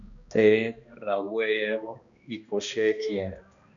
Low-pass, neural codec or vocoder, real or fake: 7.2 kHz; codec, 16 kHz, 1 kbps, X-Codec, HuBERT features, trained on balanced general audio; fake